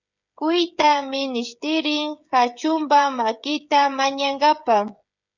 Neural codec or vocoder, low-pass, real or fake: codec, 16 kHz, 16 kbps, FreqCodec, smaller model; 7.2 kHz; fake